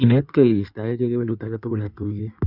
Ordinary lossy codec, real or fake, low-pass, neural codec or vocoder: none; fake; 5.4 kHz; codec, 16 kHz in and 24 kHz out, 1.1 kbps, FireRedTTS-2 codec